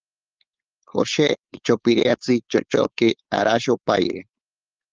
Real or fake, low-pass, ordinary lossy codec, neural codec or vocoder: fake; 7.2 kHz; Opus, 24 kbps; codec, 16 kHz, 4.8 kbps, FACodec